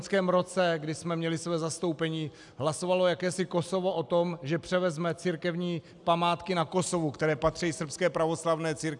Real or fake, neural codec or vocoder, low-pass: real; none; 10.8 kHz